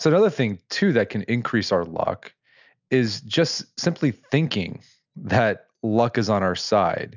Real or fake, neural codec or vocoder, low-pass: real; none; 7.2 kHz